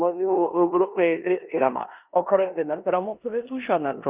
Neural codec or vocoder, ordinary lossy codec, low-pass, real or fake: codec, 16 kHz in and 24 kHz out, 0.9 kbps, LongCat-Audio-Codec, four codebook decoder; Opus, 64 kbps; 3.6 kHz; fake